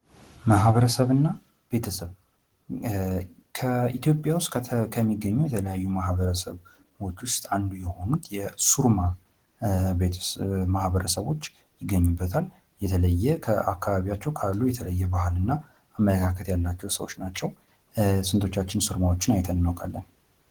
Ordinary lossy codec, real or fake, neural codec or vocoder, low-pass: Opus, 16 kbps; real; none; 19.8 kHz